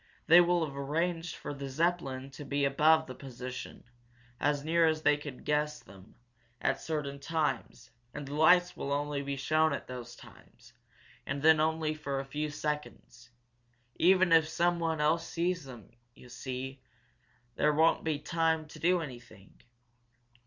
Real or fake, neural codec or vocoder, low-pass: real; none; 7.2 kHz